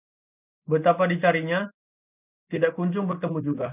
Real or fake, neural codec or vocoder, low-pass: real; none; 3.6 kHz